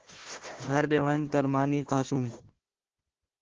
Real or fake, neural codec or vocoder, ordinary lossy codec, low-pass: fake; codec, 16 kHz, 1 kbps, FunCodec, trained on Chinese and English, 50 frames a second; Opus, 16 kbps; 7.2 kHz